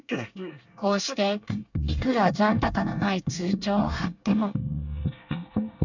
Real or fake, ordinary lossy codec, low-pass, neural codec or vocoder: fake; none; 7.2 kHz; codec, 24 kHz, 1 kbps, SNAC